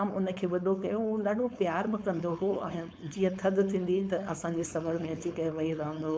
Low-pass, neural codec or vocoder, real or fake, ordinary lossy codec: none; codec, 16 kHz, 4.8 kbps, FACodec; fake; none